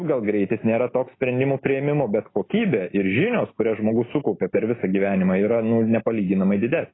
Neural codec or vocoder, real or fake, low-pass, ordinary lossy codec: none; real; 7.2 kHz; AAC, 16 kbps